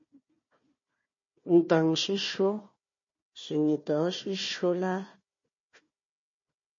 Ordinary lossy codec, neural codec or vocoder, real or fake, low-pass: MP3, 32 kbps; codec, 16 kHz, 1 kbps, FunCodec, trained on Chinese and English, 50 frames a second; fake; 7.2 kHz